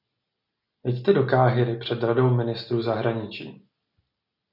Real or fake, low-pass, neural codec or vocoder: real; 5.4 kHz; none